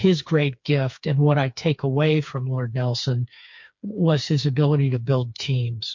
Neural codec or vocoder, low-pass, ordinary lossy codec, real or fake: codec, 16 kHz, 4 kbps, FreqCodec, smaller model; 7.2 kHz; MP3, 48 kbps; fake